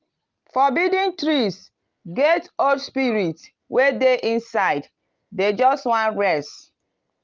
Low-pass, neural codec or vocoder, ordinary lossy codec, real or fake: 7.2 kHz; none; Opus, 24 kbps; real